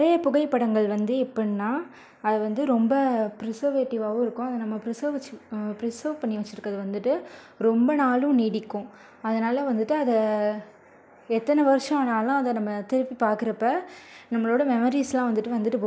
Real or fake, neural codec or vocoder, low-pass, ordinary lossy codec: real; none; none; none